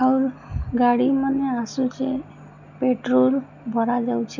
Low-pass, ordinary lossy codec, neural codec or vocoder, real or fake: 7.2 kHz; none; none; real